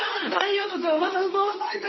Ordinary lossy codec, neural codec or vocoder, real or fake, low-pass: MP3, 24 kbps; codec, 24 kHz, 0.9 kbps, WavTokenizer, medium speech release version 2; fake; 7.2 kHz